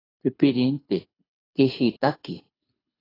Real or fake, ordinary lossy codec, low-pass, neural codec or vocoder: fake; AAC, 24 kbps; 5.4 kHz; codec, 24 kHz, 6 kbps, HILCodec